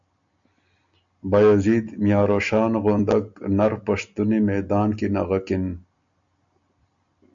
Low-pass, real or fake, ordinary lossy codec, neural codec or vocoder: 7.2 kHz; real; MP3, 64 kbps; none